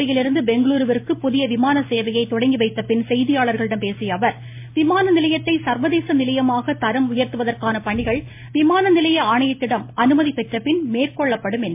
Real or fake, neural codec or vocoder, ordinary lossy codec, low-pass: real; none; MP3, 24 kbps; 3.6 kHz